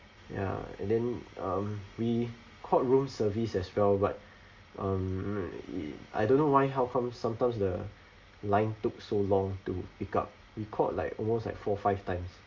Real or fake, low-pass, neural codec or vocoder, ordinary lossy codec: real; 7.2 kHz; none; Opus, 32 kbps